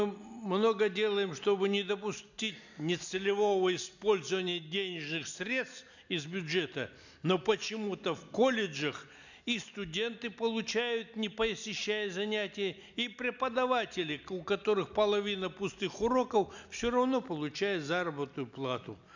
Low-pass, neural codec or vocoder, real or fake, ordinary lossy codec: 7.2 kHz; none; real; none